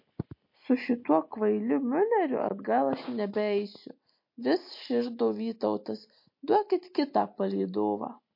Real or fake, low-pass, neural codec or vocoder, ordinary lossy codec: real; 5.4 kHz; none; MP3, 32 kbps